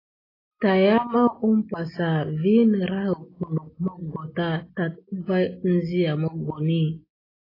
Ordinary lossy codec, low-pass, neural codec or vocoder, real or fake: AAC, 24 kbps; 5.4 kHz; none; real